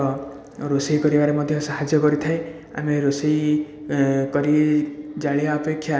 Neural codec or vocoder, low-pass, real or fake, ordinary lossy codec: none; none; real; none